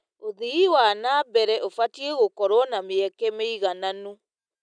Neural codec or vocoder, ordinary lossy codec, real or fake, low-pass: none; none; real; 9.9 kHz